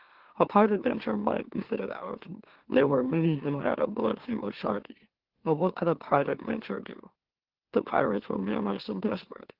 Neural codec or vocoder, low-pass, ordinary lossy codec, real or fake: autoencoder, 44.1 kHz, a latent of 192 numbers a frame, MeloTTS; 5.4 kHz; Opus, 16 kbps; fake